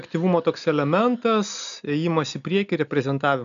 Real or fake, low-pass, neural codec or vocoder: real; 7.2 kHz; none